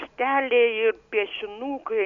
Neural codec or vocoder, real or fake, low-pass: none; real; 7.2 kHz